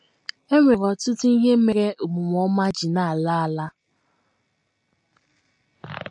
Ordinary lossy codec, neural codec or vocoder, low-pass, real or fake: MP3, 48 kbps; none; 10.8 kHz; real